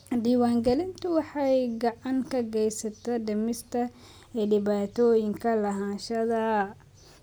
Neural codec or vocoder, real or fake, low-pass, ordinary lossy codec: none; real; none; none